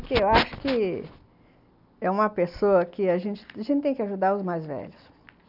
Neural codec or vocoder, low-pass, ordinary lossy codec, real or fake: none; 5.4 kHz; none; real